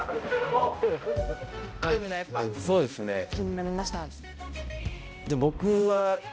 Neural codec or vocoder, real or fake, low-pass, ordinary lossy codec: codec, 16 kHz, 0.5 kbps, X-Codec, HuBERT features, trained on balanced general audio; fake; none; none